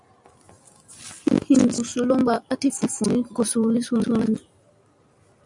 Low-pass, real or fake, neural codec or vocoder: 10.8 kHz; fake; vocoder, 44.1 kHz, 128 mel bands every 256 samples, BigVGAN v2